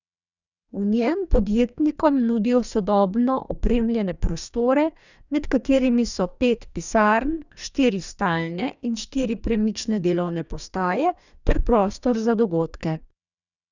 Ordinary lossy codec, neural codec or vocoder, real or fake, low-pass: none; codec, 44.1 kHz, 2.6 kbps, DAC; fake; 7.2 kHz